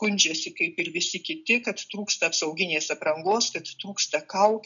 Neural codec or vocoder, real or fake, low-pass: none; real; 7.2 kHz